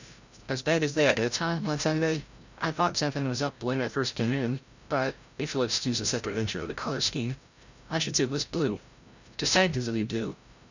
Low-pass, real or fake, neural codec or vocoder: 7.2 kHz; fake; codec, 16 kHz, 0.5 kbps, FreqCodec, larger model